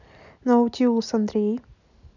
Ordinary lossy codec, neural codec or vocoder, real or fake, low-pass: none; none; real; 7.2 kHz